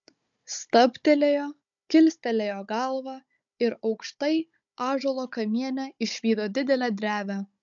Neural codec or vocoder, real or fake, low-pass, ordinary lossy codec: codec, 16 kHz, 16 kbps, FunCodec, trained on Chinese and English, 50 frames a second; fake; 7.2 kHz; MP3, 64 kbps